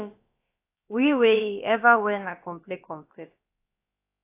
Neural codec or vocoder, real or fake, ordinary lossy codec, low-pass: codec, 16 kHz, about 1 kbps, DyCAST, with the encoder's durations; fake; AAC, 24 kbps; 3.6 kHz